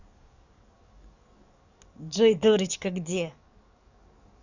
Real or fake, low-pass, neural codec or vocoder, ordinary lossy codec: fake; 7.2 kHz; codec, 44.1 kHz, 7.8 kbps, DAC; none